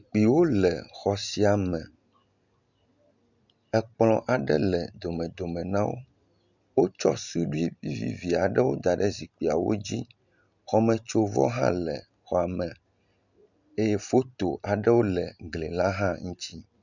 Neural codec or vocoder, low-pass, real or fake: none; 7.2 kHz; real